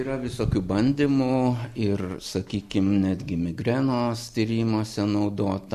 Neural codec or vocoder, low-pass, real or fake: none; 14.4 kHz; real